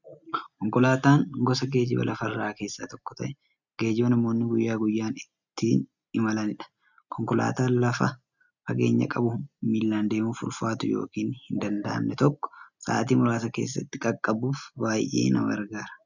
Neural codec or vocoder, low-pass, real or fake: none; 7.2 kHz; real